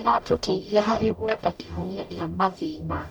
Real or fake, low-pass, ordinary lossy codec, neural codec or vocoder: fake; 19.8 kHz; none; codec, 44.1 kHz, 0.9 kbps, DAC